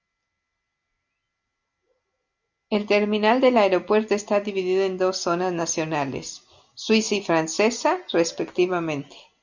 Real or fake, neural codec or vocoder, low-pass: real; none; 7.2 kHz